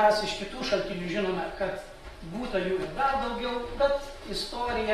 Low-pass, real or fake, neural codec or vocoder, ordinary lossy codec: 19.8 kHz; real; none; AAC, 32 kbps